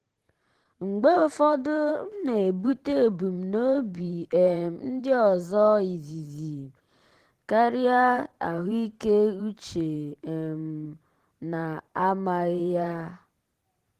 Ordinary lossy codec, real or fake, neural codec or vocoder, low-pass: Opus, 16 kbps; fake; vocoder, 44.1 kHz, 128 mel bands every 512 samples, BigVGAN v2; 14.4 kHz